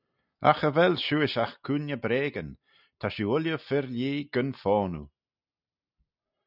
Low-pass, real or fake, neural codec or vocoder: 5.4 kHz; real; none